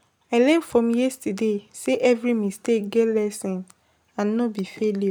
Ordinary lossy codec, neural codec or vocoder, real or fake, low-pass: none; none; real; none